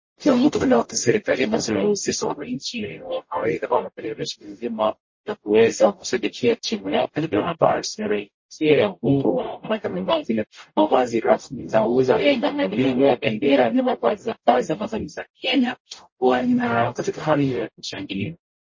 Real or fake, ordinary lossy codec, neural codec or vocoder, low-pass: fake; MP3, 32 kbps; codec, 44.1 kHz, 0.9 kbps, DAC; 7.2 kHz